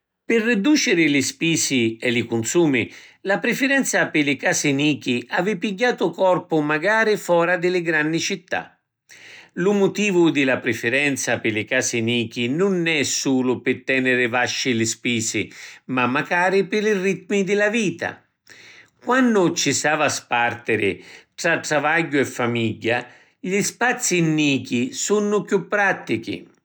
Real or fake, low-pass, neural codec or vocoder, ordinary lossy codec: real; none; none; none